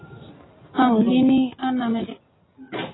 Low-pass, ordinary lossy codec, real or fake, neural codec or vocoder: 7.2 kHz; AAC, 16 kbps; real; none